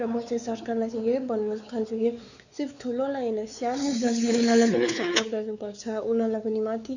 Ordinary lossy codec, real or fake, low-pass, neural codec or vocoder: none; fake; 7.2 kHz; codec, 16 kHz, 4 kbps, X-Codec, WavLM features, trained on Multilingual LibriSpeech